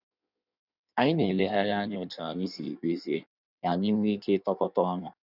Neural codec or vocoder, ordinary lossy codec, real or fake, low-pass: codec, 16 kHz in and 24 kHz out, 1.1 kbps, FireRedTTS-2 codec; none; fake; 5.4 kHz